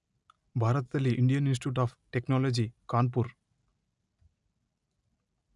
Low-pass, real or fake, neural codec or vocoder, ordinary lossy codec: 10.8 kHz; real; none; none